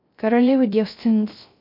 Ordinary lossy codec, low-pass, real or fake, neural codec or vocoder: MP3, 48 kbps; 5.4 kHz; fake; codec, 16 kHz, 0.3 kbps, FocalCodec